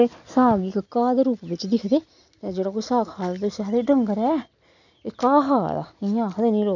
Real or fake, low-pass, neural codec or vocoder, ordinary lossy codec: real; 7.2 kHz; none; none